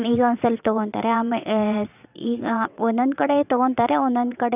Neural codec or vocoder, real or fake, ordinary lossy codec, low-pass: vocoder, 22.05 kHz, 80 mel bands, WaveNeXt; fake; none; 3.6 kHz